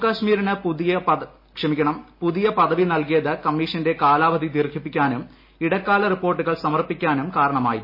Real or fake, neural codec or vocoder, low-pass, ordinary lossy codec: real; none; 5.4 kHz; MP3, 24 kbps